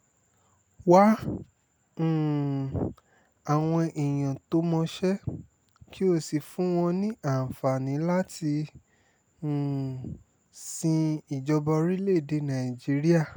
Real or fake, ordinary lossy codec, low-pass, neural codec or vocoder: real; none; none; none